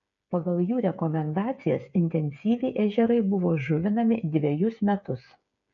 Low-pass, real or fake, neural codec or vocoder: 7.2 kHz; fake; codec, 16 kHz, 8 kbps, FreqCodec, smaller model